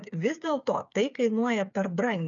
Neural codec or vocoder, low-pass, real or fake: codec, 16 kHz, 8 kbps, FreqCodec, smaller model; 7.2 kHz; fake